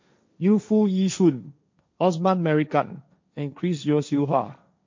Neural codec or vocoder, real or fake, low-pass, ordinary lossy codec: codec, 16 kHz, 1.1 kbps, Voila-Tokenizer; fake; 7.2 kHz; MP3, 48 kbps